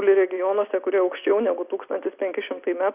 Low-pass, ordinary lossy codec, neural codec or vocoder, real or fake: 3.6 kHz; Opus, 64 kbps; none; real